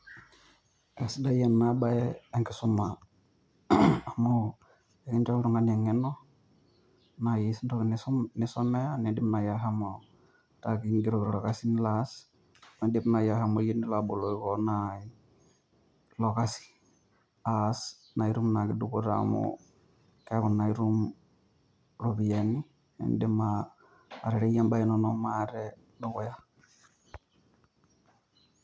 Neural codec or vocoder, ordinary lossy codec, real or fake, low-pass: none; none; real; none